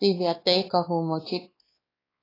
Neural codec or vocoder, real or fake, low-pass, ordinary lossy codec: codec, 16 kHz, 2 kbps, X-Codec, WavLM features, trained on Multilingual LibriSpeech; fake; 5.4 kHz; AAC, 24 kbps